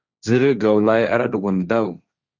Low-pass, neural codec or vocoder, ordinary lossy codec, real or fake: 7.2 kHz; codec, 16 kHz, 1.1 kbps, Voila-Tokenizer; Opus, 64 kbps; fake